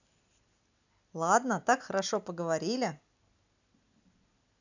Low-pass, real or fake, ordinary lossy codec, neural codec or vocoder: 7.2 kHz; real; none; none